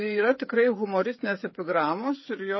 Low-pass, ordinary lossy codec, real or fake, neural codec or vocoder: 7.2 kHz; MP3, 24 kbps; fake; codec, 16 kHz, 8 kbps, FreqCodec, smaller model